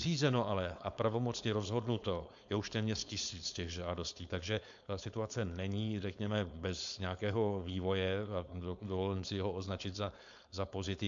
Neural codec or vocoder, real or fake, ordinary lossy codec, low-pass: codec, 16 kHz, 4.8 kbps, FACodec; fake; MP3, 64 kbps; 7.2 kHz